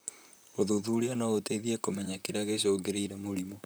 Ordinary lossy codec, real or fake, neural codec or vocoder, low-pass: none; fake; vocoder, 44.1 kHz, 128 mel bands, Pupu-Vocoder; none